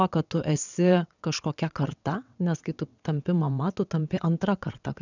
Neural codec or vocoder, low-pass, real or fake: vocoder, 22.05 kHz, 80 mel bands, WaveNeXt; 7.2 kHz; fake